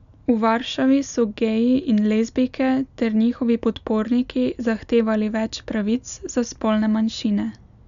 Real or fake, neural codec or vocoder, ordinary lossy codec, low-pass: real; none; none; 7.2 kHz